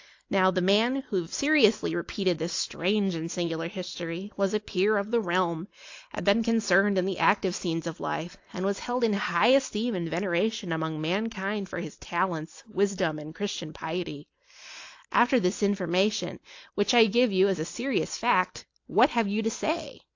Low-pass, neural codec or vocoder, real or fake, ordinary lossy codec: 7.2 kHz; none; real; AAC, 48 kbps